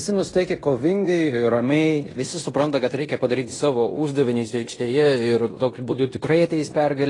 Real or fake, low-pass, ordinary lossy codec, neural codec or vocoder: fake; 10.8 kHz; AAC, 32 kbps; codec, 16 kHz in and 24 kHz out, 0.9 kbps, LongCat-Audio-Codec, fine tuned four codebook decoder